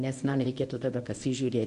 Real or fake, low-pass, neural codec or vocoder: fake; 10.8 kHz; codec, 24 kHz, 0.9 kbps, WavTokenizer, medium speech release version 1